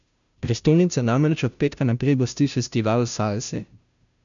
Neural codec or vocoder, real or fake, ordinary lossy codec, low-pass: codec, 16 kHz, 0.5 kbps, FunCodec, trained on Chinese and English, 25 frames a second; fake; none; 7.2 kHz